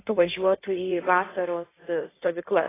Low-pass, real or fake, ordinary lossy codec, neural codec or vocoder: 3.6 kHz; fake; AAC, 16 kbps; codec, 16 kHz in and 24 kHz out, 1.1 kbps, FireRedTTS-2 codec